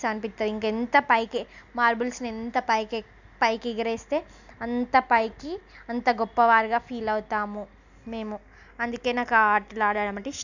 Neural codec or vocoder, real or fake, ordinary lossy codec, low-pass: none; real; none; 7.2 kHz